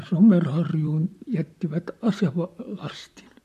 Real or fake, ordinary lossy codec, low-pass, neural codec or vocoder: fake; MP3, 64 kbps; 14.4 kHz; vocoder, 44.1 kHz, 128 mel bands, Pupu-Vocoder